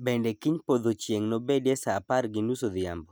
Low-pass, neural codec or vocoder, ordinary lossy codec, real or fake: none; none; none; real